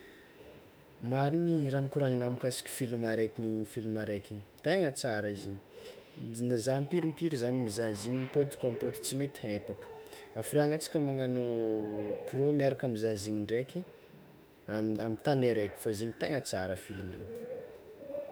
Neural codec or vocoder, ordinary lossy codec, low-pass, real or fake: autoencoder, 48 kHz, 32 numbers a frame, DAC-VAE, trained on Japanese speech; none; none; fake